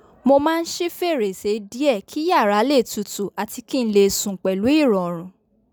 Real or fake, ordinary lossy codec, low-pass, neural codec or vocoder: real; none; none; none